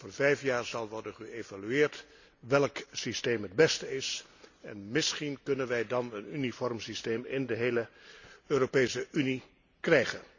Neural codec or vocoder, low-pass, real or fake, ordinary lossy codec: none; 7.2 kHz; real; none